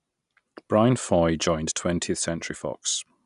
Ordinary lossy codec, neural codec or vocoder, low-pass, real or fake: none; none; 10.8 kHz; real